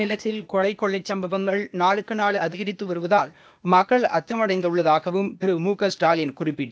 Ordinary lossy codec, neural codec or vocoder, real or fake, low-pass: none; codec, 16 kHz, 0.8 kbps, ZipCodec; fake; none